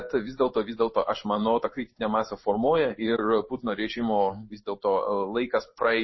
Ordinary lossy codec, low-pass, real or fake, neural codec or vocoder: MP3, 24 kbps; 7.2 kHz; fake; codec, 16 kHz in and 24 kHz out, 1 kbps, XY-Tokenizer